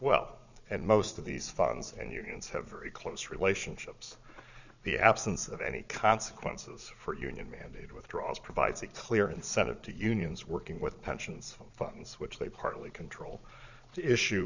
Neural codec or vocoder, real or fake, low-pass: vocoder, 22.05 kHz, 80 mel bands, Vocos; fake; 7.2 kHz